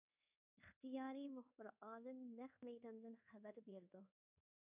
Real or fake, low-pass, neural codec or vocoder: fake; 3.6 kHz; codec, 24 kHz, 1.2 kbps, DualCodec